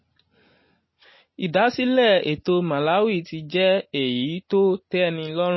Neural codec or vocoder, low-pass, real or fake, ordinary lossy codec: none; 7.2 kHz; real; MP3, 24 kbps